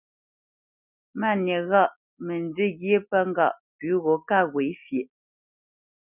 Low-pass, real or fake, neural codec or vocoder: 3.6 kHz; real; none